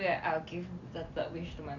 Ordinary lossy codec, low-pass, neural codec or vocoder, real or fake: none; 7.2 kHz; none; real